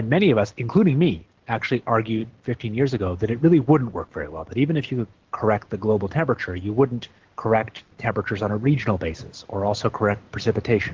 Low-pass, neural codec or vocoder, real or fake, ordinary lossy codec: 7.2 kHz; none; real; Opus, 16 kbps